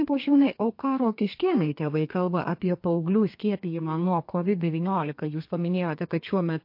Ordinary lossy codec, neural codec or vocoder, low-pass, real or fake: MP3, 32 kbps; codec, 32 kHz, 1.9 kbps, SNAC; 5.4 kHz; fake